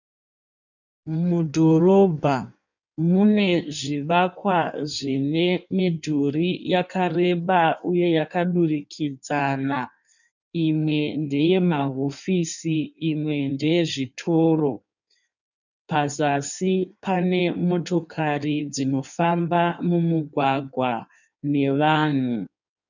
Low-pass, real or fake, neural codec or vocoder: 7.2 kHz; fake; codec, 16 kHz in and 24 kHz out, 1.1 kbps, FireRedTTS-2 codec